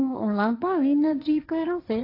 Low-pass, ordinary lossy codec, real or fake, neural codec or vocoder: 5.4 kHz; AAC, 32 kbps; fake; codec, 16 kHz, 1.1 kbps, Voila-Tokenizer